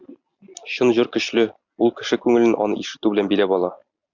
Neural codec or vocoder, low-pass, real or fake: none; 7.2 kHz; real